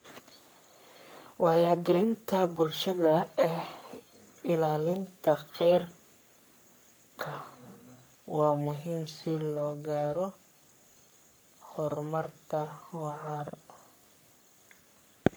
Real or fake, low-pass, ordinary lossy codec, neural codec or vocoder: fake; none; none; codec, 44.1 kHz, 3.4 kbps, Pupu-Codec